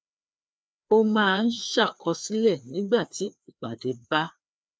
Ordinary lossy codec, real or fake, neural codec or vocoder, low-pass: none; fake; codec, 16 kHz, 2 kbps, FreqCodec, larger model; none